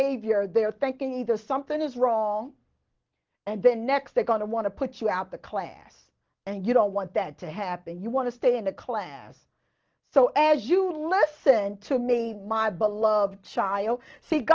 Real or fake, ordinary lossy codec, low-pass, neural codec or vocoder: real; Opus, 16 kbps; 7.2 kHz; none